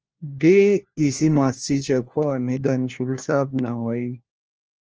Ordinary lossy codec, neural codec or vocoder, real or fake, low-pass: Opus, 24 kbps; codec, 16 kHz, 1 kbps, FunCodec, trained on LibriTTS, 50 frames a second; fake; 7.2 kHz